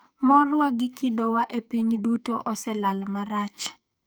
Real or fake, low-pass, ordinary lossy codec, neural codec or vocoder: fake; none; none; codec, 44.1 kHz, 2.6 kbps, SNAC